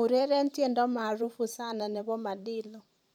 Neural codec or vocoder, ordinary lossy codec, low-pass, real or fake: vocoder, 44.1 kHz, 128 mel bands every 512 samples, BigVGAN v2; none; 19.8 kHz; fake